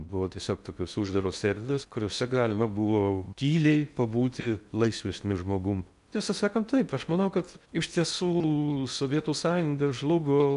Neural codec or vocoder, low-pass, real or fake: codec, 16 kHz in and 24 kHz out, 0.6 kbps, FocalCodec, streaming, 4096 codes; 10.8 kHz; fake